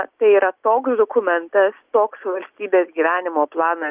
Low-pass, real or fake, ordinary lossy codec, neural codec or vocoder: 3.6 kHz; real; Opus, 32 kbps; none